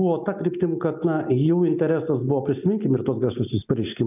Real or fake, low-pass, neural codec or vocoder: real; 3.6 kHz; none